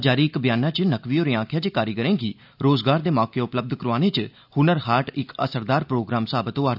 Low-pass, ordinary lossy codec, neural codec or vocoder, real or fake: 5.4 kHz; none; none; real